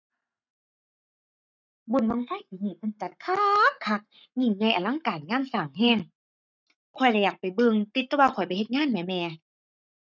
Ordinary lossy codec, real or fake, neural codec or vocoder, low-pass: none; fake; codec, 44.1 kHz, 7.8 kbps, Pupu-Codec; 7.2 kHz